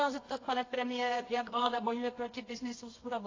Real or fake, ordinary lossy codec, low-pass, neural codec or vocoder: fake; AAC, 32 kbps; 7.2 kHz; codec, 24 kHz, 0.9 kbps, WavTokenizer, medium music audio release